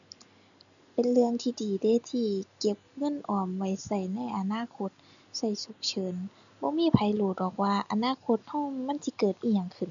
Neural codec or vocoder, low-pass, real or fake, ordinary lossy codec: none; 7.2 kHz; real; none